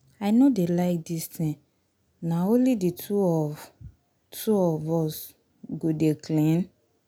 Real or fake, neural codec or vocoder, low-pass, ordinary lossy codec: real; none; 19.8 kHz; none